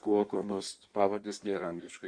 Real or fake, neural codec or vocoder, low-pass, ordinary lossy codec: fake; codec, 16 kHz in and 24 kHz out, 1.1 kbps, FireRedTTS-2 codec; 9.9 kHz; Opus, 64 kbps